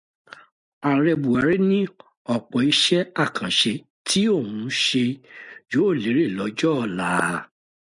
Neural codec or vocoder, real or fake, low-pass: none; real; 10.8 kHz